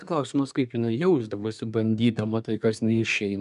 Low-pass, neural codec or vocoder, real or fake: 10.8 kHz; codec, 24 kHz, 1 kbps, SNAC; fake